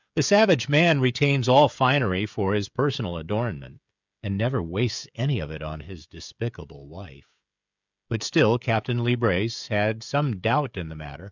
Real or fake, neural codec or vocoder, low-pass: fake; codec, 16 kHz, 16 kbps, FreqCodec, smaller model; 7.2 kHz